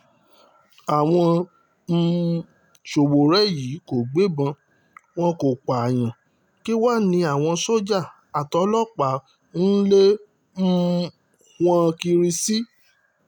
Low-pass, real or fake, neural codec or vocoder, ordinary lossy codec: 19.8 kHz; real; none; none